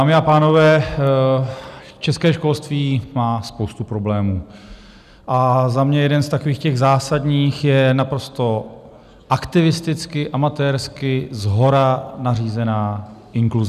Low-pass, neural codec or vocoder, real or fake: 14.4 kHz; none; real